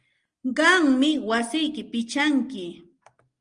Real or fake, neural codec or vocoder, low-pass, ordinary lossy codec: real; none; 9.9 kHz; Opus, 24 kbps